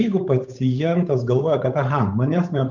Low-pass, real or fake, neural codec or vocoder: 7.2 kHz; fake; codec, 16 kHz, 8 kbps, FunCodec, trained on Chinese and English, 25 frames a second